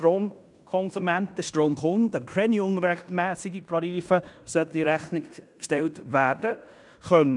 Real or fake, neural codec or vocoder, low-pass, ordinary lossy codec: fake; codec, 16 kHz in and 24 kHz out, 0.9 kbps, LongCat-Audio-Codec, fine tuned four codebook decoder; 10.8 kHz; none